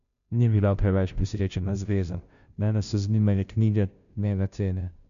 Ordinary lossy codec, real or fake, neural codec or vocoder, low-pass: none; fake; codec, 16 kHz, 0.5 kbps, FunCodec, trained on Chinese and English, 25 frames a second; 7.2 kHz